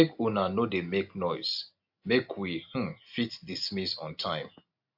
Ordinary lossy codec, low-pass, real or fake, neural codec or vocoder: AAC, 48 kbps; 5.4 kHz; real; none